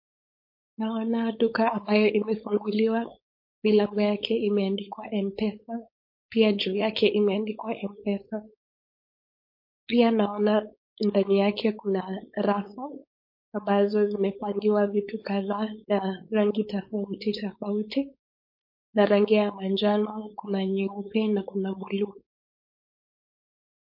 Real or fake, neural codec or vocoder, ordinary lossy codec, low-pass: fake; codec, 16 kHz, 4.8 kbps, FACodec; MP3, 32 kbps; 5.4 kHz